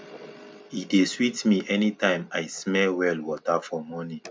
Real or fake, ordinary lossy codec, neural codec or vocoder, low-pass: real; none; none; none